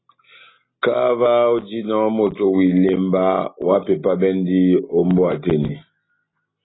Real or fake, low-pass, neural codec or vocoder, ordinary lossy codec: real; 7.2 kHz; none; AAC, 16 kbps